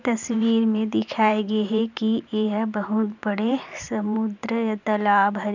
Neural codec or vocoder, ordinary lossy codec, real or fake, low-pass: vocoder, 44.1 kHz, 128 mel bands every 512 samples, BigVGAN v2; none; fake; 7.2 kHz